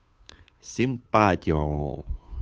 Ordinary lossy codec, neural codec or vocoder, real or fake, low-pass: none; codec, 16 kHz, 2 kbps, FunCodec, trained on Chinese and English, 25 frames a second; fake; none